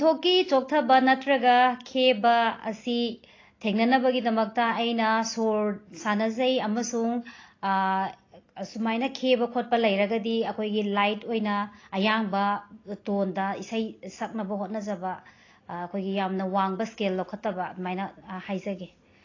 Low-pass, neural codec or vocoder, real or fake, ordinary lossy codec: 7.2 kHz; none; real; AAC, 32 kbps